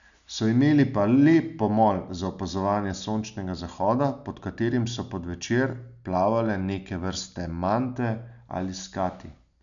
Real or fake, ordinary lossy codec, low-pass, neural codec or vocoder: real; none; 7.2 kHz; none